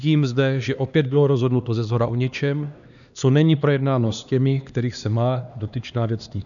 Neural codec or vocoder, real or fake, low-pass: codec, 16 kHz, 2 kbps, X-Codec, HuBERT features, trained on LibriSpeech; fake; 7.2 kHz